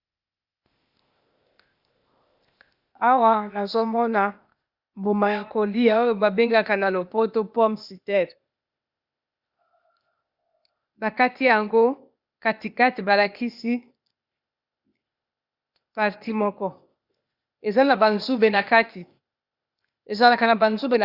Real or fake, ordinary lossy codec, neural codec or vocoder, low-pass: fake; Opus, 64 kbps; codec, 16 kHz, 0.8 kbps, ZipCodec; 5.4 kHz